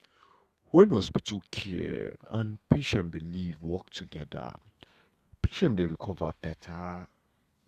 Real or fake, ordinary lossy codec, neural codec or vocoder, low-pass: fake; Opus, 64 kbps; codec, 32 kHz, 1.9 kbps, SNAC; 14.4 kHz